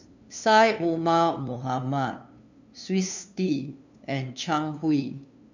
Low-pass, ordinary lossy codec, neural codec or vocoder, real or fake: 7.2 kHz; none; codec, 16 kHz, 2 kbps, FunCodec, trained on LibriTTS, 25 frames a second; fake